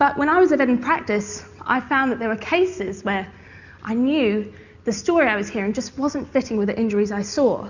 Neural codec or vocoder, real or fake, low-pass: none; real; 7.2 kHz